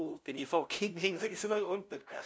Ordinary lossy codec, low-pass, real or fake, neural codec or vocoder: none; none; fake; codec, 16 kHz, 0.5 kbps, FunCodec, trained on LibriTTS, 25 frames a second